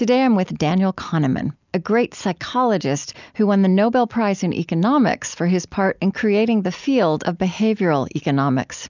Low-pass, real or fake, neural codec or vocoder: 7.2 kHz; real; none